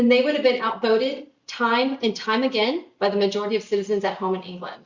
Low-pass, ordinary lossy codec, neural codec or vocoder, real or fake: 7.2 kHz; Opus, 64 kbps; none; real